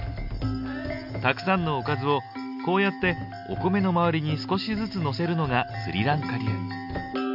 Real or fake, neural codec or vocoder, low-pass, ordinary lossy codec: real; none; 5.4 kHz; none